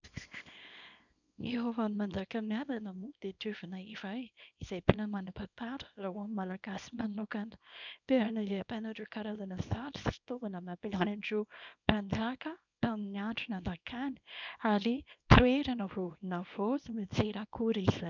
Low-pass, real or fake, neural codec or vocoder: 7.2 kHz; fake; codec, 24 kHz, 0.9 kbps, WavTokenizer, small release